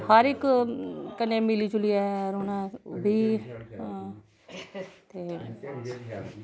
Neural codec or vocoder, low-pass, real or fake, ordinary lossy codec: none; none; real; none